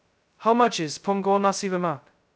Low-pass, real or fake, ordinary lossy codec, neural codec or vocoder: none; fake; none; codec, 16 kHz, 0.2 kbps, FocalCodec